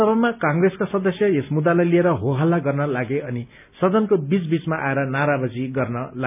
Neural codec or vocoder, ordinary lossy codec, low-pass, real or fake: none; none; 3.6 kHz; real